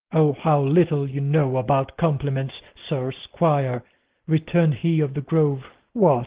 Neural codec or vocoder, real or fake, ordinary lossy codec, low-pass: none; real; Opus, 16 kbps; 3.6 kHz